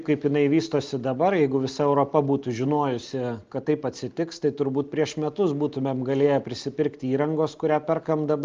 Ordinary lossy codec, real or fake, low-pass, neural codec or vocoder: Opus, 24 kbps; real; 7.2 kHz; none